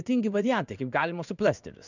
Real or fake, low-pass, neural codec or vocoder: fake; 7.2 kHz; codec, 16 kHz in and 24 kHz out, 1 kbps, XY-Tokenizer